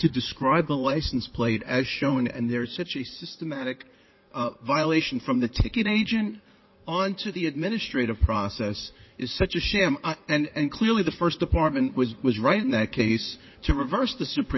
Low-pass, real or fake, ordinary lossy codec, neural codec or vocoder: 7.2 kHz; fake; MP3, 24 kbps; codec, 16 kHz in and 24 kHz out, 2.2 kbps, FireRedTTS-2 codec